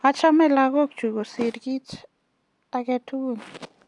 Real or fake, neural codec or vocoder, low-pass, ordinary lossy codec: real; none; 10.8 kHz; none